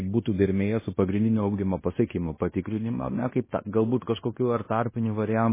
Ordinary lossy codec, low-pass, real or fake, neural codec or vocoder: MP3, 16 kbps; 3.6 kHz; fake; codec, 16 kHz in and 24 kHz out, 0.9 kbps, LongCat-Audio-Codec, fine tuned four codebook decoder